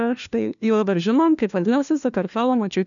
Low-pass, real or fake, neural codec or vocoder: 7.2 kHz; fake; codec, 16 kHz, 1 kbps, FunCodec, trained on LibriTTS, 50 frames a second